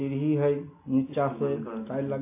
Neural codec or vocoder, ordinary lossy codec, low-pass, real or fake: none; MP3, 24 kbps; 3.6 kHz; real